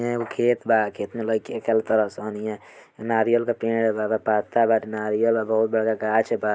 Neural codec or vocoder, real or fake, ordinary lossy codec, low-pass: none; real; none; none